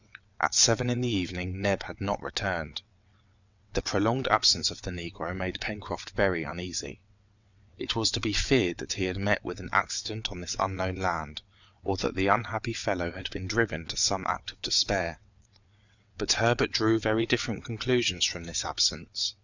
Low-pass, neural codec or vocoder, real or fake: 7.2 kHz; vocoder, 22.05 kHz, 80 mel bands, WaveNeXt; fake